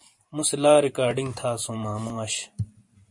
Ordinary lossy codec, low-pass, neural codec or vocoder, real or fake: MP3, 48 kbps; 10.8 kHz; vocoder, 44.1 kHz, 128 mel bands every 512 samples, BigVGAN v2; fake